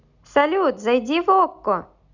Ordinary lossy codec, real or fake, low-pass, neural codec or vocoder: none; real; 7.2 kHz; none